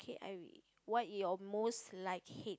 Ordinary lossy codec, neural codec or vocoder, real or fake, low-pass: none; none; real; none